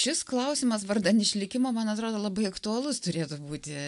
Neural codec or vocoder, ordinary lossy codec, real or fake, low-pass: none; AAC, 96 kbps; real; 10.8 kHz